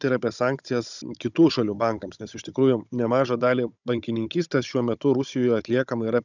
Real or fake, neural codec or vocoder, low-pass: fake; codec, 16 kHz, 16 kbps, FunCodec, trained on Chinese and English, 50 frames a second; 7.2 kHz